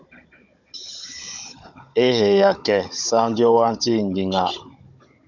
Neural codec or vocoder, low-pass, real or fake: codec, 16 kHz, 16 kbps, FunCodec, trained on Chinese and English, 50 frames a second; 7.2 kHz; fake